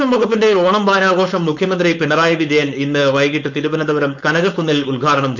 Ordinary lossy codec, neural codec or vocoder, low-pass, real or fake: none; codec, 16 kHz, 4.8 kbps, FACodec; 7.2 kHz; fake